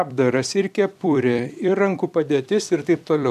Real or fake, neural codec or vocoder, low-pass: fake; vocoder, 44.1 kHz, 128 mel bands every 256 samples, BigVGAN v2; 14.4 kHz